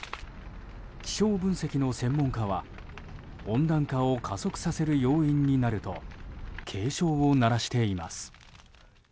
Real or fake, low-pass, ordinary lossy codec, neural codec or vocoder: real; none; none; none